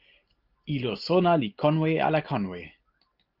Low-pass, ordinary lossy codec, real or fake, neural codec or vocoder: 5.4 kHz; Opus, 32 kbps; real; none